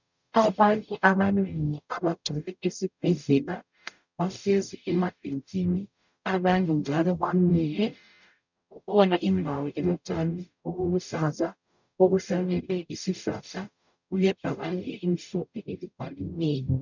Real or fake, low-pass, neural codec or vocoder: fake; 7.2 kHz; codec, 44.1 kHz, 0.9 kbps, DAC